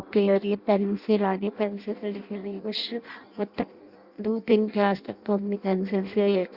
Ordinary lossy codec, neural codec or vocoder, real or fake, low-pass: Opus, 64 kbps; codec, 16 kHz in and 24 kHz out, 0.6 kbps, FireRedTTS-2 codec; fake; 5.4 kHz